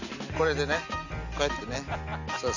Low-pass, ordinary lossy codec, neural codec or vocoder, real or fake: 7.2 kHz; none; none; real